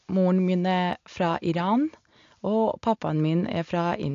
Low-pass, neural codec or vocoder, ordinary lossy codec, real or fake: 7.2 kHz; none; AAC, 48 kbps; real